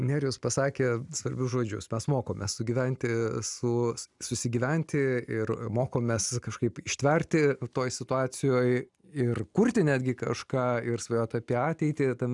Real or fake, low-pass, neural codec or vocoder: real; 10.8 kHz; none